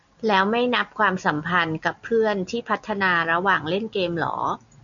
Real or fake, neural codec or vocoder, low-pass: real; none; 7.2 kHz